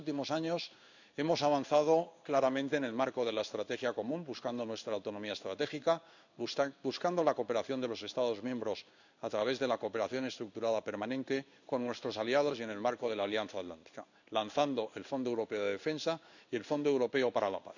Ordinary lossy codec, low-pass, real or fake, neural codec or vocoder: none; 7.2 kHz; fake; codec, 16 kHz in and 24 kHz out, 1 kbps, XY-Tokenizer